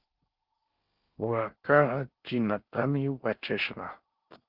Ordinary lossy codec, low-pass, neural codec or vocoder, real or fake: Opus, 24 kbps; 5.4 kHz; codec, 16 kHz in and 24 kHz out, 0.6 kbps, FocalCodec, streaming, 4096 codes; fake